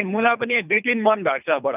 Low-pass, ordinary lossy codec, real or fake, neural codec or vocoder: 3.6 kHz; none; fake; codec, 24 kHz, 3 kbps, HILCodec